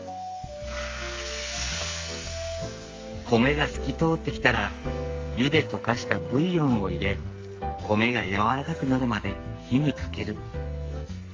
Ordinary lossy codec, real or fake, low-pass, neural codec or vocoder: Opus, 32 kbps; fake; 7.2 kHz; codec, 44.1 kHz, 2.6 kbps, SNAC